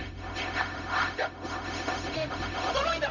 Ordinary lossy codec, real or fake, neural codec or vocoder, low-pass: none; fake; codec, 16 kHz, 0.4 kbps, LongCat-Audio-Codec; 7.2 kHz